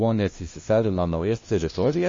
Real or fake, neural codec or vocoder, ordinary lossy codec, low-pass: fake; codec, 16 kHz, 0.5 kbps, FunCodec, trained on LibriTTS, 25 frames a second; MP3, 32 kbps; 7.2 kHz